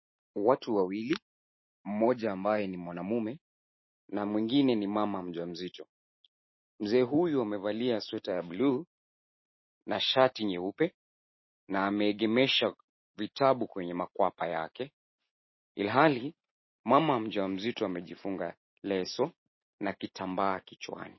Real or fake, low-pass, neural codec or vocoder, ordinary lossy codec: real; 7.2 kHz; none; MP3, 24 kbps